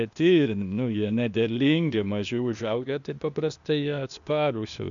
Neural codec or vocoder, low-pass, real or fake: codec, 16 kHz, 0.8 kbps, ZipCodec; 7.2 kHz; fake